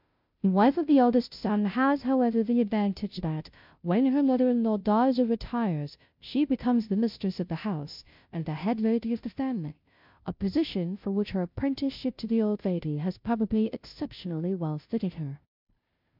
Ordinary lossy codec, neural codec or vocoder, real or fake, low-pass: MP3, 48 kbps; codec, 16 kHz, 0.5 kbps, FunCodec, trained on Chinese and English, 25 frames a second; fake; 5.4 kHz